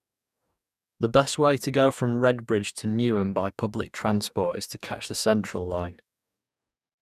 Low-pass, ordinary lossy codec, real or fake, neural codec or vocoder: 14.4 kHz; none; fake; codec, 44.1 kHz, 2.6 kbps, DAC